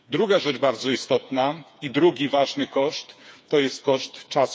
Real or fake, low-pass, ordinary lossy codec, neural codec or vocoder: fake; none; none; codec, 16 kHz, 4 kbps, FreqCodec, smaller model